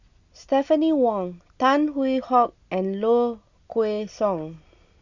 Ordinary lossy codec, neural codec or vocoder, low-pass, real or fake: Opus, 64 kbps; none; 7.2 kHz; real